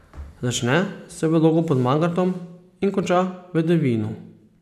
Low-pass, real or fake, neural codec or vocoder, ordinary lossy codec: 14.4 kHz; real; none; none